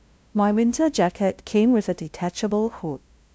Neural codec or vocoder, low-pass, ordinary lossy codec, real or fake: codec, 16 kHz, 0.5 kbps, FunCodec, trained on LibriTTS, 25 frames a second; none; none; fake